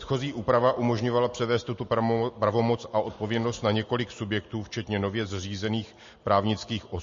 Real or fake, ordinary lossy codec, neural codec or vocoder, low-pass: real; MP3, 32 kbps; none; 7.2 kHz